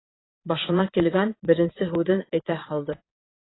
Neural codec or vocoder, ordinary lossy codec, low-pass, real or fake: vocoder, 22.05 kHz, 80 mel bands, Vocos; AAC, 16 kbps; 7.2 kHz; fake